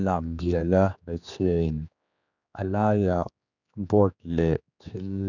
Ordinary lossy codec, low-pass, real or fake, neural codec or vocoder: none; 7.2 kHz; fake; codec, 16 kHz, 2 kbps, X-Codec, HuBERT features, trained on general audio